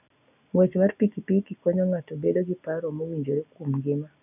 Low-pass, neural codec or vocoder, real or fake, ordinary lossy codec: 3.6 kHz; none; real; none